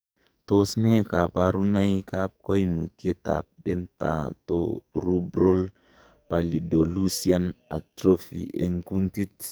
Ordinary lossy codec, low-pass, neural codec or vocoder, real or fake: none; none; codec, 44.1 kHz, 2.6 kbps, SNAC; fake